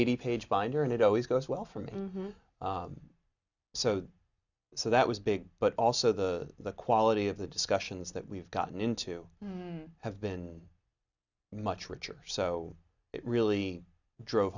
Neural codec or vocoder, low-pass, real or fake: none; 7.2 kHz; real